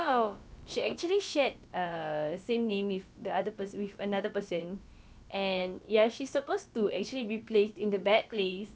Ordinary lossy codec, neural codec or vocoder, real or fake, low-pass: none; codec, 16 kHz, about 1 kbps, DyCAST, with the encoder's durations; fake; none